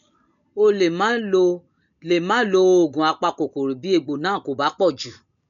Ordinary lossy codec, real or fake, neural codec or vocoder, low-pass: none; real; none; 7.2 kHz